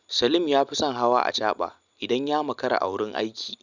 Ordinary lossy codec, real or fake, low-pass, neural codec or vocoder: none; real; 7.2 kHz; none